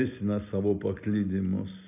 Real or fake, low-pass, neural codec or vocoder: real; 3.6 kHz; none